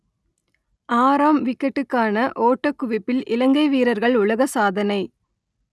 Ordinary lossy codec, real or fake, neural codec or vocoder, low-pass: none; real; none; none